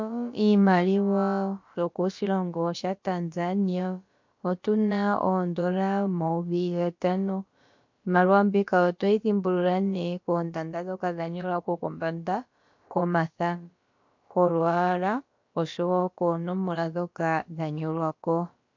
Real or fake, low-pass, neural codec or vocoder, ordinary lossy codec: fake; 7.2 kHz; codec, 16 kHz, about 1 kbps, DyCAST, with the encoder's durations; MP3, 48 kbps